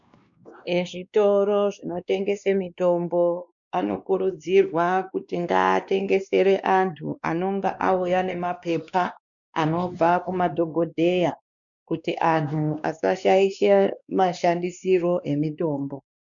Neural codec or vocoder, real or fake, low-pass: codec, 16 kHz, 2 kbps, X-Codec, WavLM features, trained on Multilingual LibriSpeech; fake; 7.2 kHz